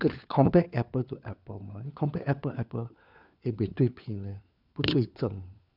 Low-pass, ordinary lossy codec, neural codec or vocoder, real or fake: 5.4 kHz; none; codec, 16 kHz, 4 kbps, FunCodec, trained on LibriTTS, 50 frames a second; fake